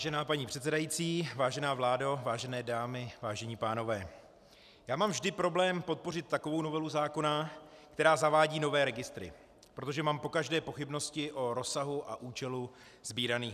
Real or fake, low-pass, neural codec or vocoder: real; 14.4 kHz; none